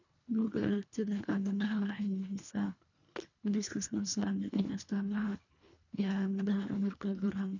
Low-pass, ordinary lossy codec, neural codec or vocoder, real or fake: 7.2 kHz; none; codec, 24 kHz, 1.5 kbps, HILCodec; fake